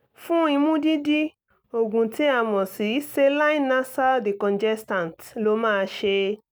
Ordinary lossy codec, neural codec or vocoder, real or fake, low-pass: none; none; real; none